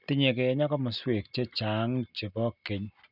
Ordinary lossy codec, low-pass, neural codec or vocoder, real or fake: none; 5.4 kHz; none; real